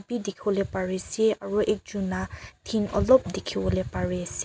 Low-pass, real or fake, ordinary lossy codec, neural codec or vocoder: none; real; none; none